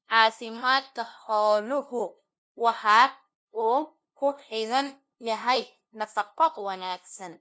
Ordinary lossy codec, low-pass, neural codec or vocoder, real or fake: none; none; codec, 16 kHz, 0.5 kbps, FunCodec, trained on LibriTTS, 25 frames a second; fake